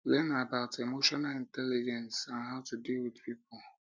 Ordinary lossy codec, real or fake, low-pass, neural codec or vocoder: none; real; none; none